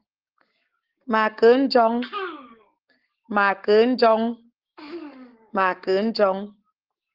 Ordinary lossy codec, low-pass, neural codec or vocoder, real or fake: Opus, 16 kbps; 5.4 kHz; codec, 44.1 kHz, 7.8 kbps, Pupu-Codec; fake